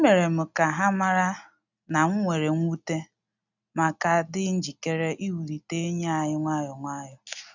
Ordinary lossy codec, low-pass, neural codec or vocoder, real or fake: none; 7.2 kHz; none; real